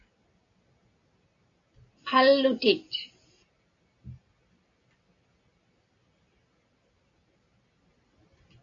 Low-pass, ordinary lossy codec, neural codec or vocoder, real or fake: 7.2 kHz; AAC, 64 kbps; none; real